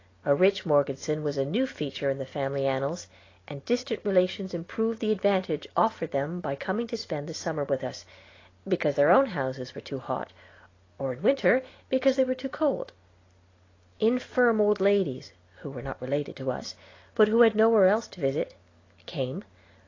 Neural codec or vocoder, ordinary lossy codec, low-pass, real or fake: none; AAC, 32 kbps; 7.2 kHz; real